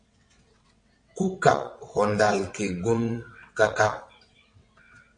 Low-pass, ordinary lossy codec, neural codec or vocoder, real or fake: 9.9 kHz; MP3, 48 kbps; vocoder, 22.05 kHz, 80 mel bands, WaveNeXt; fake